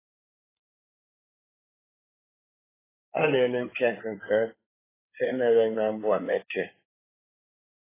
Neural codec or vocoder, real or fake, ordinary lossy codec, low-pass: codec, 16 kHz in and 24 kHz out, 2.2 kbps, FireRedTTS-2 codec; fake; AAC, 16 kbps; 3.6 kHz